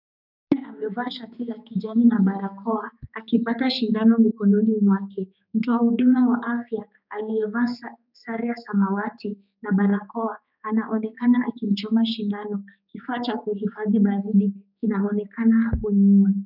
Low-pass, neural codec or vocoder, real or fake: 5.4 kHz; codec, 16 kHz, 4 kbps, X-Codec, HuBERT features, trained on general audio; fake